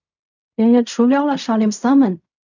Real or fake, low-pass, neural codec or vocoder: fake; 7.2 kHz; codec, 16 kHz in and 24 kHz out, 0.4 kbps, LongCat-Audio-Codec, fine tuned four codebook decoder